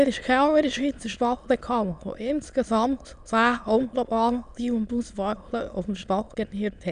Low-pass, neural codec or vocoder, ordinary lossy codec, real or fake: 9.9 kHz; autoencoder, 22.05 kHz, a latent of 192 numbers a frame, VITS, trained on many speakers; none; fake